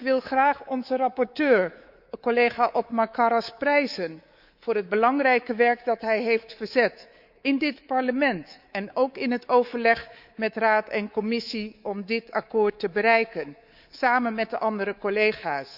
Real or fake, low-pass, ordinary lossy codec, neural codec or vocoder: fake; 5.4 kHz; Opus, 64 kbps; codec, 24 kHz, 3.1 kbps, DualCodec